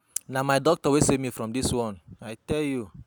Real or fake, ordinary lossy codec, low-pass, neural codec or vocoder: real; none; none; none